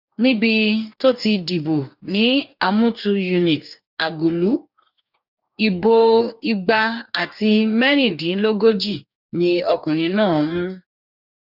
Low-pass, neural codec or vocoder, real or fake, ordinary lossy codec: 5.4 kHz; codec, 44.1 kHz, 2.6 kbps, DAC; fake; none